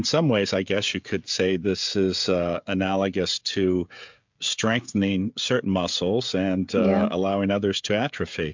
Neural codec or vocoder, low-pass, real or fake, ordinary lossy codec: codec, 16 kHz, 16 kbps, FreqCodec, smaller model; 7.2 kHz; fake; MP3, 64 kbps